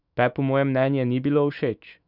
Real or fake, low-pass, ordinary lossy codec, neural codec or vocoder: fake; 5.4 kHz; none; autoencoder, 48 kHz, 128 numbers a frame, DAC-VAE, trained on Japanese speech